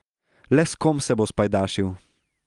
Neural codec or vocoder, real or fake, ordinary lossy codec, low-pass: none; real; Opus, 32 kbps; 10.8 kHz